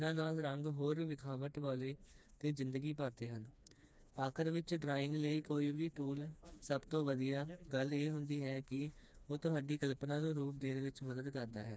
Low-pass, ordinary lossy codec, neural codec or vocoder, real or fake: none; none; codec, 16 kHz, 2 kbps, FreqCodec, smaller model; fake